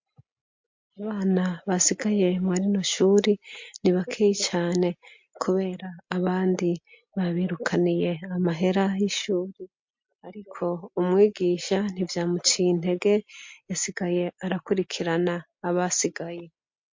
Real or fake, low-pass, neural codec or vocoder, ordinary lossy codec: real; 7.2 kHz; none; MP3, 48 kbps